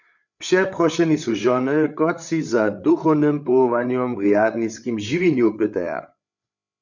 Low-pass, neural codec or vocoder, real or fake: 7.2 kHz; codec, 16 kHz, 8 kbps, FreqCodec, larger model; fake